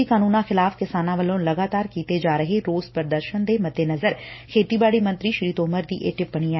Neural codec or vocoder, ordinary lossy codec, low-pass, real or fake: none; MP3, 24 kbps; 7.2 kHz; real